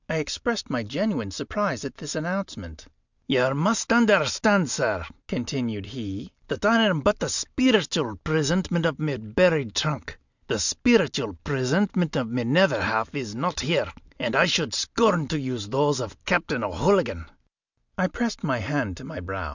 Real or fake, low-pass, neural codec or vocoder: real; 7.2 kHz; none